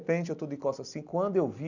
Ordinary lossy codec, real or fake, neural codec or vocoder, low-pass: none; real; none; 7.2 kHz